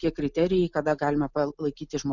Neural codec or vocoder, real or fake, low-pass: none; real; 7.2 kHz